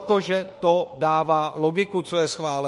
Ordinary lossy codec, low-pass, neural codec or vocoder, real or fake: MP3, 48 kbps; 14.4 kHz; autoencoder, 48 kHz, 32 numbers a frame, DAC-VAE, trained on Japanese speech; fake